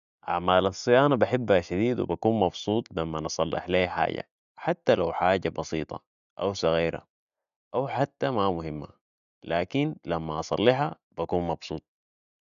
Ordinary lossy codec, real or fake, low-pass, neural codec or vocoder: none; real; 7.2 kHz; none